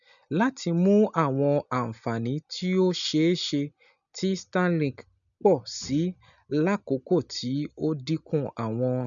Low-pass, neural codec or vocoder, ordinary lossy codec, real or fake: 7.2 kHz; none; none; real